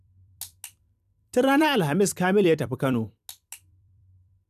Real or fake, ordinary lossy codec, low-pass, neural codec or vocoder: fake; none; 14.4 kHz; vocoder, 44.1 kHz, 128 mel bands every 256 samples, BigVGAN v2